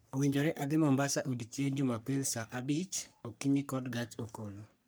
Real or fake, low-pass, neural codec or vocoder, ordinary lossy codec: fake; none; codec, 44.1 kHz, 3.4 kbps, Pupu-Codec; none